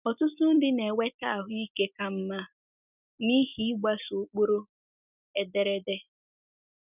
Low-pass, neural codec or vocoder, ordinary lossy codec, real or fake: 3.6 kHz; none; none; real